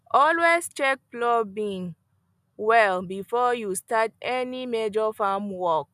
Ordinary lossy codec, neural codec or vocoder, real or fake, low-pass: none; none; real; 14.4 kHz